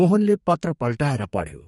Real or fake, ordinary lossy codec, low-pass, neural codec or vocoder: fake; MP3, 48 kbps; 14.4 kHz; codec, 32 kHz, 1.9 kbps, SNAC